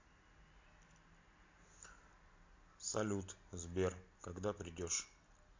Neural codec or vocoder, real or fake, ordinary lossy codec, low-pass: none; real; MP3, 48 kbps; 7.2 kHz